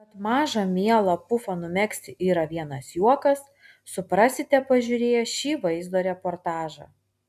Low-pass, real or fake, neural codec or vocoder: 14.4 kHz; real; none